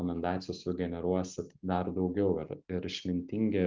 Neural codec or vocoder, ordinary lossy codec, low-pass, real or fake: none; Opus, 32 kbps; 7.2 kHz; real